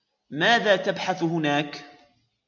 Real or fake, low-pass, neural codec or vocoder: real; 7.2 kHz; none